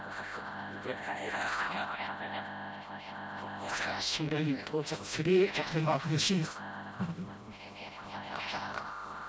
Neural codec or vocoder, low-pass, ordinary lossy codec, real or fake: codec, 16 kHz, 0.5 kbps, FreqCodec, smaller model; none; none; fake